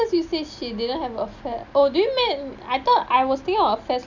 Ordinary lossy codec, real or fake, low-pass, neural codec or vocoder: none; real; 7.2 kHz; none